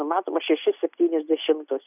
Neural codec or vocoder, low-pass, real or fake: none; 3.6 kHz; real